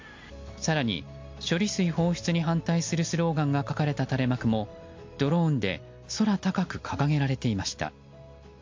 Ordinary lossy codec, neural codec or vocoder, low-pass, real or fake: MP3, 48 kbps; none; 7.2 kHz; real